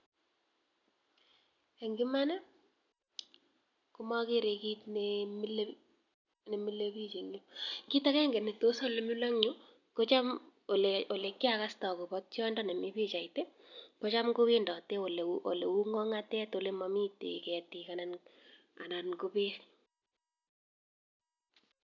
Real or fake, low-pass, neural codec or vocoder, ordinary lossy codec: real; 7.2 kHz; none; none